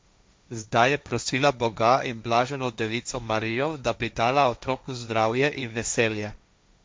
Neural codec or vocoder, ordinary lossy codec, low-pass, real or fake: codec, 16 kHz, 1.1 kbps, Voila-Tokenizer; none; none; fake